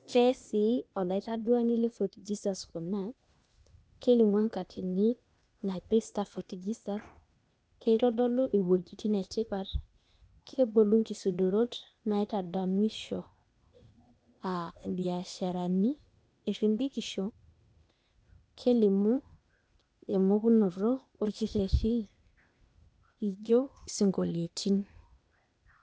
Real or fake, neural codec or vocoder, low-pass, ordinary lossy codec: fake; codec, 16 kHz, 0.8 kbps, ZipCodec; none; none